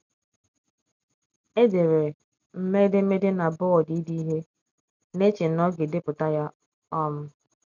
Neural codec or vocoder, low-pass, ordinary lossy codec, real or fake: none; 7.2 kHz; none; real